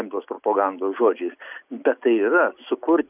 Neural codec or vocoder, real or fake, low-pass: none; real; 3.6 kHz